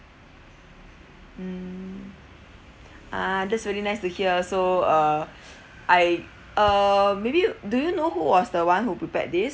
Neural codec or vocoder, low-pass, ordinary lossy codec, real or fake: none; none; none; real